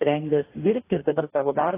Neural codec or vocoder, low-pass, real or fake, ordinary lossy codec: codec, 44.1 kHz, 2.6 kbps, DAC; 3.6 kHz; fake; AAC, 16 kbps